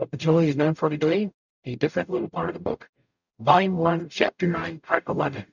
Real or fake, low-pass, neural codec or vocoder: fake; 7.2 kHz; codec, 44.1 kHz, 0.9 kbps, DAC